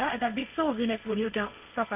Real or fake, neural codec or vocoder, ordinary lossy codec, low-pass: fake; codec, 16 kHz, 1.1 kbps, Voila-Tokenizer; none; 3.6 kHz